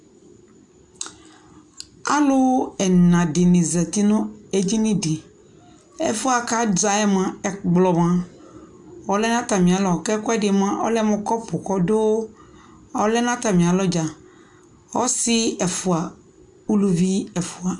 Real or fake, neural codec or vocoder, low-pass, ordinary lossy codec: real; none; 10.8 kHz; MP3, 96 kbps